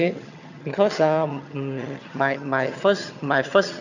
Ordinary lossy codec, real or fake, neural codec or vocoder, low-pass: none; fake; vocoder, 22.05 kHz, 80 mel bands, HiFi-GAN; 7.2 kHz